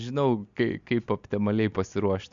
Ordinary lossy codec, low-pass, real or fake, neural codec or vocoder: MP3, 64 kbps; 7.2 kHz; real; none